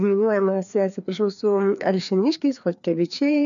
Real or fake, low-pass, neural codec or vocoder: fake; 7.2 kHz; codec, 16 kHz, 2 kbps, FreqCodec, larger model